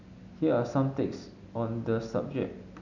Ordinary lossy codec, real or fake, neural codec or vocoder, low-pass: none; real; none; 7.2 kHz